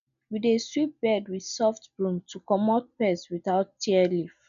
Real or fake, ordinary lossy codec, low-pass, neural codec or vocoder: real; none; 7.2 kHz; none